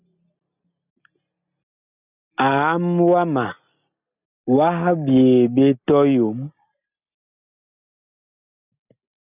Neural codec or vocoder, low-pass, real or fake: none; 3.6 kHz; real